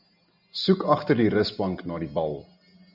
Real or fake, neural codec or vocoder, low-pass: real; none; 5.4 kHz